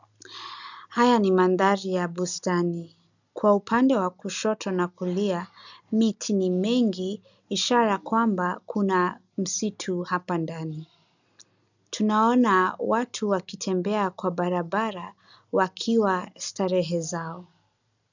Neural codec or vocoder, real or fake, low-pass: none; real; 7.2 kHz